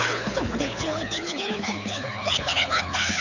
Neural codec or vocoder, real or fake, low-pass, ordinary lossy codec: codec, 24 kHz, 6 kbps, HILCodec; fake; 7.2 kHz; none